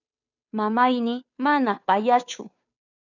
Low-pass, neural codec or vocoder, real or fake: 7.2 kHz; codec, 16 kHz, 2 kbps, FunCodec, trained on Chinese and English, 25 frames a second; fake